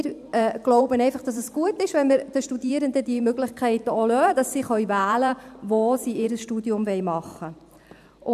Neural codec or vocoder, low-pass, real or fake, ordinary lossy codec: vocoder, 44.1 kHz, 128 mel bands every 512 samples, BigVGAN v2; 14.4 kHz; fake; none